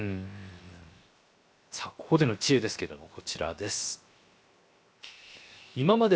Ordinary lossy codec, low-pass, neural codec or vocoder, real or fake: none; none; codec, 16 kHz, 0.7 kbps, FocalCodec; fake